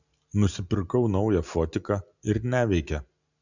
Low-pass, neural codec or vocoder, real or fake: 7.2 kHz; none; real